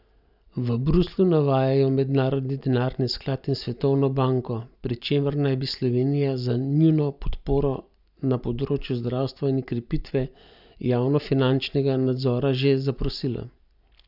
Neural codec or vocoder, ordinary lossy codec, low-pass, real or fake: none; none; 5.4 kHz; real